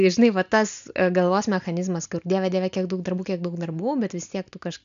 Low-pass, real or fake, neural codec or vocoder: 7.2 kHz; real; none